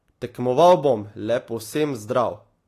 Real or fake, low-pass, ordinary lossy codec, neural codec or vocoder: real; 14.4 kHz; AAC, 48 kbps; none